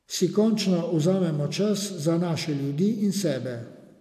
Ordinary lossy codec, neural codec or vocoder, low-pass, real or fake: AAC, 96 kbps; none; 14.4 kHz; real